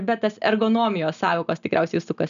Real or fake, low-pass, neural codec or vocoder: real; 7.2 kHz; none